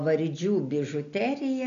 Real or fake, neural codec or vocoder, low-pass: real; none; 7.2 kHz